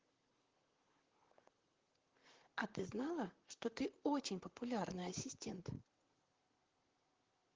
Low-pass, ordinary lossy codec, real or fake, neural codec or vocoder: 7.2 kHz; Opus, 16 kbps; fake; vocoder, 44.1 kHz, 128 mel bands, Pupu-Vocoder